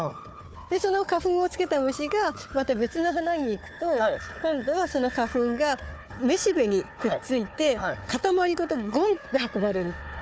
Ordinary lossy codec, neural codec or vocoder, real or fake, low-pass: none; codec, 16 kHz, 4 kbps, FunCodec, trained on Chinese and English, 50 frames a second; fake; none